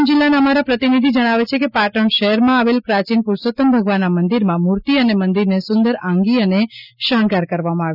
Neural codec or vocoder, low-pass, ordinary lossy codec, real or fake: none; 5.4 kHz; none; real